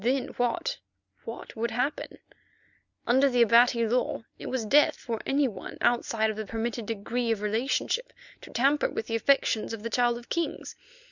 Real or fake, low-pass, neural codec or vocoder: real; 7.2 kHz; none